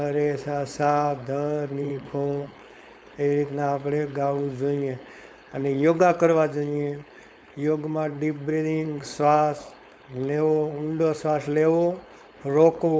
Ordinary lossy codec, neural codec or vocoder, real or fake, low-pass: none; codec, 16 kHz, 4.8 kbps, FACodec; fake; none